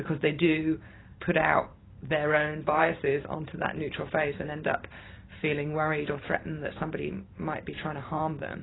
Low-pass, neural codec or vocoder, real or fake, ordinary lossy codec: 7.2 kHz; vocoder, 44.1 kHz, 128 mel bands every 512 samples, BigVGAN v2; fake; AAC, 16 kbps